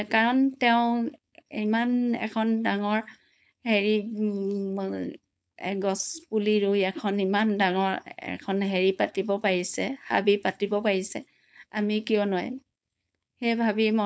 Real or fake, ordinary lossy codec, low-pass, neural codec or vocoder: fake; none; none; codec, 16 kHz, 4.8 kbps, FACodec